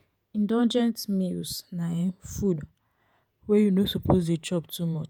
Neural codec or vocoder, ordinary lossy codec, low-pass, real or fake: vocoder, 48 kHz, 128 mel bands, Vocos; none; none; fake